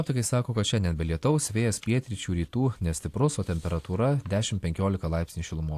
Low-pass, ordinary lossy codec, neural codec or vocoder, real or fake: 14.4 kHz; AAC, 64 kbps; none; real